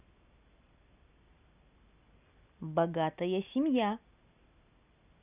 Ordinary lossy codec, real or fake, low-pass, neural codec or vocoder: none; real; 3.6 kHz; none